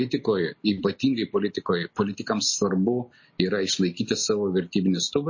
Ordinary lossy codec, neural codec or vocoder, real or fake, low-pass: MP3, 32 kbps; none; real; 7.2 kHz